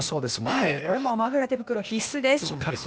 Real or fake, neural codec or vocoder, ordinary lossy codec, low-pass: fake; codec, 16 kHz, 0.8 kbps, ZipCodec; none; none